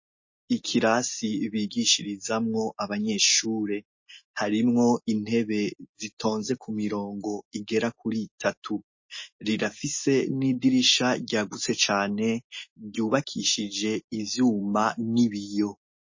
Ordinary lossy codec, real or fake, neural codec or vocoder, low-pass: MP3, 32 kbps; real; none; 7.2 kHz